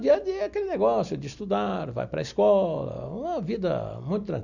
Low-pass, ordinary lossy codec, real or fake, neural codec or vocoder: 7.2 kHz; none; real; none